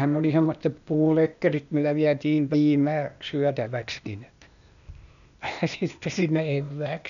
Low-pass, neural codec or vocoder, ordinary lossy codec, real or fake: 7.2 kHz; codec, 16 kHz, 0.8 kbps, ZipCodec; none; fake